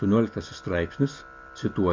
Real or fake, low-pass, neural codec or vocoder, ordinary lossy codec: real; 7.2 kHz; none; MP3, 48 kbps